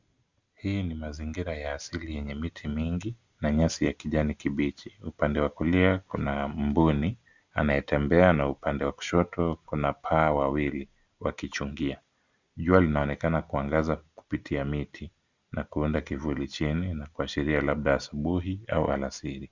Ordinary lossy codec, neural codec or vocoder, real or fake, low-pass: Opus, 64 kbps; none; real; 7.2 kHz